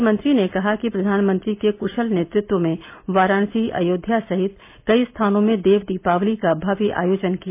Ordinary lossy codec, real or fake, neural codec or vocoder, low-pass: MP3, 24 kbps; real; none; 3.6 kHz